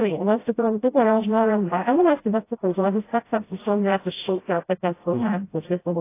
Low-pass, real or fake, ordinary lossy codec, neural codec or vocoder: 3.6 kHz; fake; AAC, 24 kbps; codec, 16 kHz, 0.5 kbps, FreqCodec, smaller model